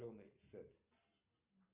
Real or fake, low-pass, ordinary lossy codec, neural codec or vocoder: real; 3.6 kHz; Opus, 32 kbps; none